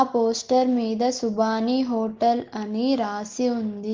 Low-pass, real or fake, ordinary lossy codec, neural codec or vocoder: 7.2 kHz; real; Opus, 16 kbps; none